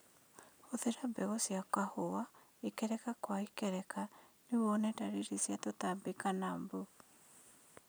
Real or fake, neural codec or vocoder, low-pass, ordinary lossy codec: real; none; none; none